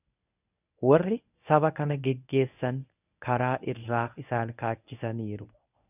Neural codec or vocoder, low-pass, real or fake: codec, 24 kHz, 0.9 kbps, WavTokenizer, medium speech release version 1; 3.6 kHz; fake